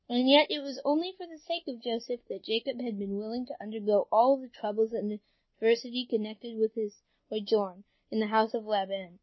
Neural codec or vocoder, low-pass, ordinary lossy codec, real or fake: none; 7.2 kHz; MP3, 24 kbps; real